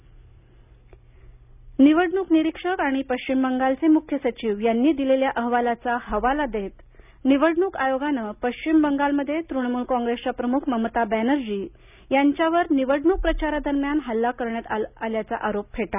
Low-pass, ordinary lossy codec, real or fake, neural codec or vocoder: 3.6 kHz; none; real; none